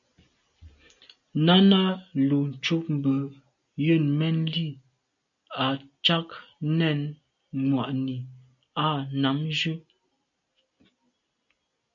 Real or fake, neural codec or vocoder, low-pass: real; none; 7.2 kHz